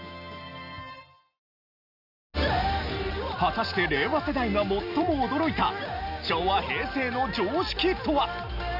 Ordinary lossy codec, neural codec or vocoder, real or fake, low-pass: none; none; real; 5.4 kHz